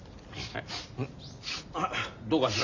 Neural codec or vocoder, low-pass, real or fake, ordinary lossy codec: none; 7.2 kHz; real; none